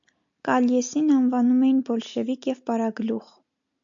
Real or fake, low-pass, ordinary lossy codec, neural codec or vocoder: real; 7.2 kHz; AAC, 64 kbps; none